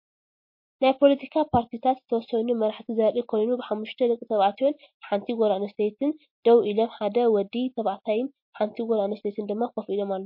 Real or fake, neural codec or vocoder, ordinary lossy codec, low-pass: real; none; MP3, 32 kbps; 5.4 kHz